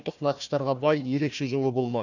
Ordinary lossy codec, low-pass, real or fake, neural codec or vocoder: none; 7.2 kHz; fake; codec, 16 kHz, 1 kbps, FunCodec, trained on Chinese and English, 50 frames a second